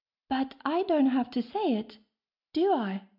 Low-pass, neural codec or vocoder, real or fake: 5.4 kHz; none; real